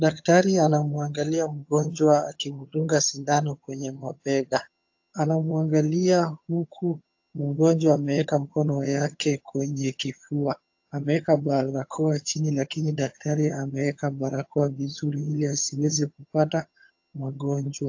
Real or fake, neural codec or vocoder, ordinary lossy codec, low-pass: fake; vocoder, 22.05 kHz, 80 mel bands, HiFi-GAN; AAC, 48 kbps; 7.2 kHz